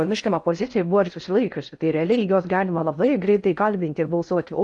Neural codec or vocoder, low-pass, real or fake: codec, 16 kHz in and 24 kHz out, 0.6 kbps, FocalCodec, streaming, 4096 codes; 10.8 kHz; fake